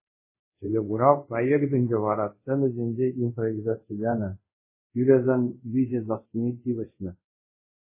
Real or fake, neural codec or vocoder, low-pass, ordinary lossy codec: fake; codec, 24 kHz, 0.5 kbps, DualCodec; 3.6 kHz; MP3, 16 kbps